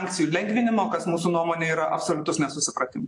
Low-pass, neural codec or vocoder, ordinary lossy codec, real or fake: 10.8 kHz; none; AAC, 48 kbps; real